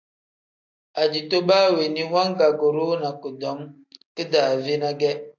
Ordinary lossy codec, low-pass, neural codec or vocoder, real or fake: AAC, 48 kbps; 7.2 kHz; none; real